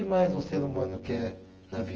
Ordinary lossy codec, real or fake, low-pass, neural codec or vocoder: Opus, 16 kbps; fake; 7.2 kHz; vocoder, 24 kHz, 100 mel bands, Vocos